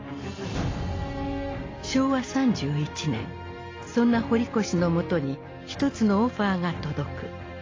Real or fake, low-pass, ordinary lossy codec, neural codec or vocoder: real; 7.2 kHz; AAC, 32 kbps; none